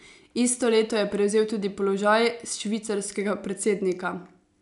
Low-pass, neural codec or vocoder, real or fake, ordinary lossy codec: 10.8 kHz; none; real; none